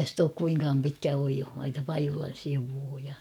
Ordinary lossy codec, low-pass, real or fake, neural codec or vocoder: none; 19.8 kHz; fake; codec, 44.1 kHz, 7.8 kbps, DAC